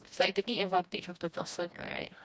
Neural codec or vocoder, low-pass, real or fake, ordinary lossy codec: codec, 16 kHz, 1 kbps, FreqCodec, smaller model; none; fake; none